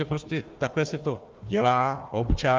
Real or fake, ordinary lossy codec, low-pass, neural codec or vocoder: fake; Opus, 32 kbps; 7.2 kHz; codec, 16 kHz, 1 kbps, FunCodec, trained on Chinese and English, 50 frames a second